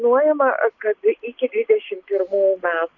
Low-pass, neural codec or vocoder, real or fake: 7.2 kHz; none; real